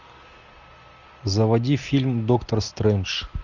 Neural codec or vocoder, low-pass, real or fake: none; 7.2 kHz; real